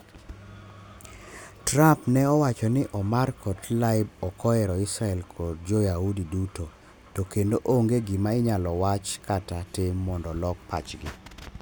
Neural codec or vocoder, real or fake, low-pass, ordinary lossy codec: none; real; none; none